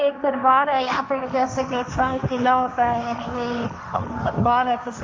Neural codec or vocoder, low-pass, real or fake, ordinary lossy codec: codec, 16 kHz, 1.1 kbps, Voila-Tokenizer; none; fake; none